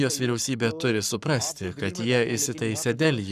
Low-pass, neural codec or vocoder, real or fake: 14.4 kHz; codec, 44.1 kHz, 7.8 kbps, Pupu-Codec; fake